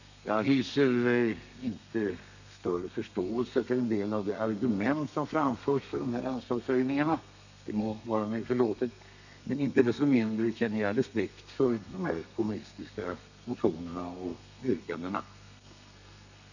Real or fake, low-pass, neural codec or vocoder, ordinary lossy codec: fake; 7.2 kHz; codec, 32 kHz, 1.9 kbps, SNAC; none